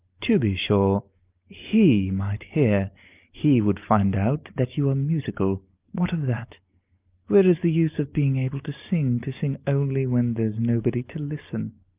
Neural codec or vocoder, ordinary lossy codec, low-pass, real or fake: none; Opus, 24 kbps; 3.6 kHz; real